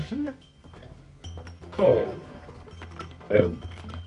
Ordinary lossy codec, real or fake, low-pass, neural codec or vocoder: MP3, 48 kbps; fake; 10.8 kHz; codec, 24 kHz, 0.9 kbps, WavTokenizer, medium music audio release